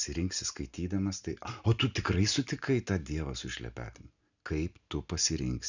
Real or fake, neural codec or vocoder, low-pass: real; none; 7.2 kHz